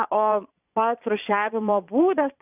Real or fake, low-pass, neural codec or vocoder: fake; 3.6 kHz; vocoder, 22.05 kHz, 80 mel bands, Vocos